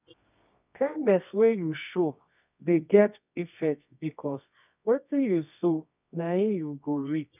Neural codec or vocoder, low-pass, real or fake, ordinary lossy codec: codec, 24 kHz, 0.9 kbps, WavTokenizer, medium music audio release; 3.6 kHz; fake; none